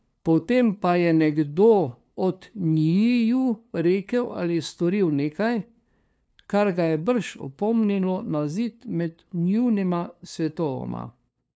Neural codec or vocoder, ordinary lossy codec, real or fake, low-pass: codec, 16 kHz, 2 kbps, FunCodec, trained on LibriTTS, 25 frames a second; none; fake; none